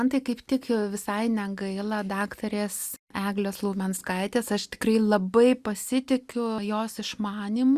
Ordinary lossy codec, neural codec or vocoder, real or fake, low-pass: Opus, 64 kbps; none; real; 14.4 kHz